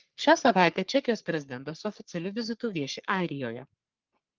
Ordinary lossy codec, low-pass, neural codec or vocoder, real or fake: Opus, 32 kbps; 7.2 kHz; codec, 44.1 kHz, 3.4 kbps, Pupu-Codec; fake